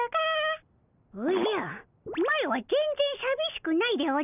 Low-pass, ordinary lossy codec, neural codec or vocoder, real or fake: 3.6 kHz; none; none; real